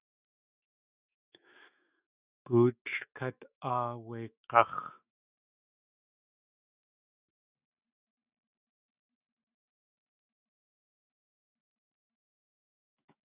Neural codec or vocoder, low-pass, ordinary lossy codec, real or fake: autoencoder, 48 kHz, 128 numbers a frame, DAC-VAE, trained on Japanese speech; 3.6 kHz; Opus, 64 kbps; fake